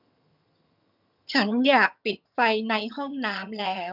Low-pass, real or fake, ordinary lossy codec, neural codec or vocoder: 5.4 kHz; fake; none; vocoder, 22.05 kHz, 80 mel bands, HiFi-GAN